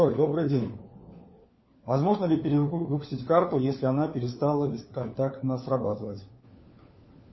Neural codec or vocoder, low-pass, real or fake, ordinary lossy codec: codec, 16 kHz, 4 kbps, FunCodec, trained on LibriTTS, 50 frames a second; 7.2 kHz; fake; MP3, 24 kbps